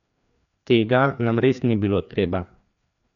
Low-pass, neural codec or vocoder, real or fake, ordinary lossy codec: 7.2 kHz; codec, 16 kHz, 2 kbps, FreqCodec, larger model; fake; none